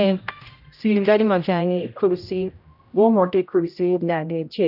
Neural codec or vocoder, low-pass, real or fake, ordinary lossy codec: codec, 16 kHz, 0.5 kbps, X-Codec, HuBERT features, trained on general audio; 5.4 kHz; fake; none